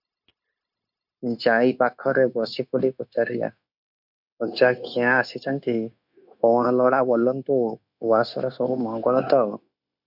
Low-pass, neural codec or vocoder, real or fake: 5.4 kHz; codec, 16 kHz, 0.9 kbps, LongCat-Audio-Codec; fake